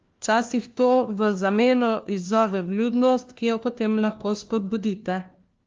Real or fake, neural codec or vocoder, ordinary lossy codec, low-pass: fake; codec, 16 kHz, 1 kbps, FunCodec, trained on LibriTTS, 50 frames a second; Opus, 24 kbps; 7.2 kHz